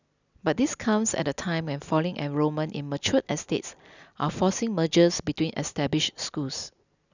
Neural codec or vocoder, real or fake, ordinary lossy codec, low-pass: none; real; none; 7.2 kHz